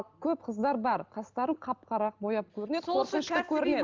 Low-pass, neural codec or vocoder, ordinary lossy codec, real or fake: 7.2 kHz; none; Opus, 24 kbps; real